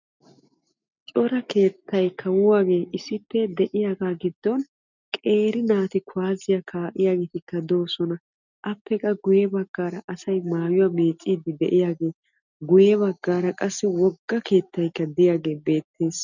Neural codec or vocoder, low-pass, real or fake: none; 7.2 kHz; real